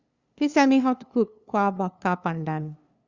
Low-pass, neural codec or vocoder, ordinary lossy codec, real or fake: 7.2 kHz; codec, 16 kHz, 2 kbps, FunCodec, trained on LibriTTS, 25 frames a second; Opus, 64 kbps; fake